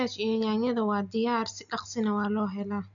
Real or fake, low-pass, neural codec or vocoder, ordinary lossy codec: real; 7.2 kHz; none; none